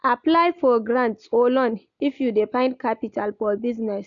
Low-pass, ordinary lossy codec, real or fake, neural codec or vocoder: 7.2 kHz; none; real; none